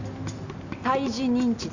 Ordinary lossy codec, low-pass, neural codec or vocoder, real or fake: none; 7.2 kHz; none; real